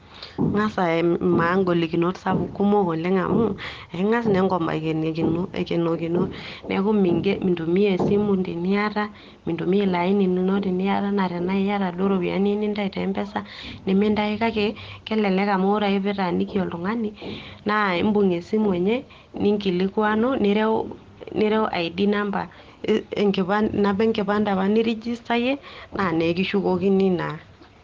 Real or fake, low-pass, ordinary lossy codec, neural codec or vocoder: real; 7.2 kHz; Opus, 16 kbps; none